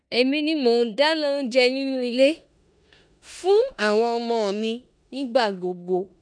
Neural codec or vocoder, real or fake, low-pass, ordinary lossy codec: codec, 16 kHz in and 24 kHz out, 0.9 kbps, LongCat-Audio-Codec, four codebook decoder; fake; 9.9 kHz; none